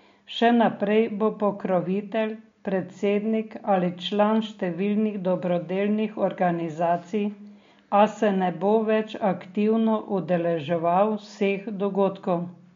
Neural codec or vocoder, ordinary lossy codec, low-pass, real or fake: none; MP3, 48 kbps; 7.2 kHz; real